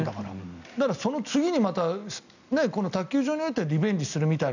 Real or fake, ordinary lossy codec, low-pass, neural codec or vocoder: real; none; 7.2 kHz; none